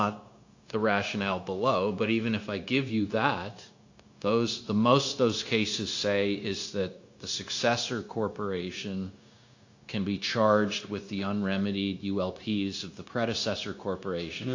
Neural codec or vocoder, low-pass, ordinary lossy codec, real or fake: codec, 16 kHz, 0.9 kbps, LongCat-Audio-Codec; 7.2 kHz; AAC, 48 kbps; fake